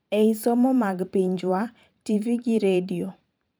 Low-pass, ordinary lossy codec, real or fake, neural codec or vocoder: none; none; fake; vocoder, 44.1 kHz, 128 mel bands every 512 samples, BigVGAN v2